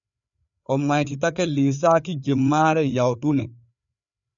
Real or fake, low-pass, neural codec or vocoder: fake; 7.2 kHz; codec, 16 kHz, 4 kbps, FreqCodec, larger model